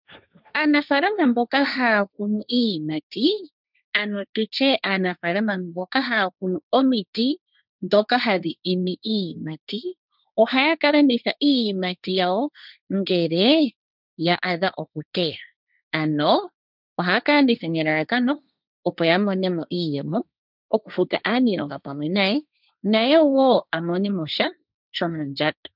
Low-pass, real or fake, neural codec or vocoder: 5.4 kHz; fake; codec, 16 kHz, 1.1 kbps, Voila-Tokenizer